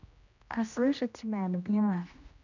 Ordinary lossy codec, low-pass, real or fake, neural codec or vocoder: none; 7.2 kHz; fake; codec, 16 kHz, 1 kbps, X-Codec, HuBERT features, trained on general audio